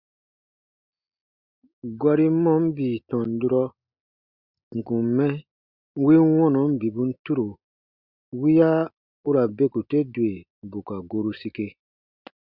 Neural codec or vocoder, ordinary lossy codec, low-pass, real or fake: none; Opus, 64 kbps; 5.4 kHz; real